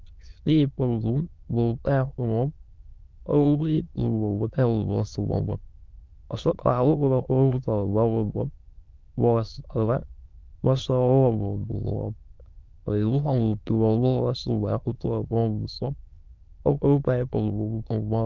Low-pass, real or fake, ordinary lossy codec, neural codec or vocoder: 7.2 kHz; fake; Opus, 24 kbps; autoencoder, 22.05 kHz, a latent of 192 numbers a frame, VITS, trained on many speakers